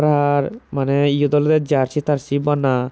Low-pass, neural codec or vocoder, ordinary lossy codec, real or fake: none; none; none; real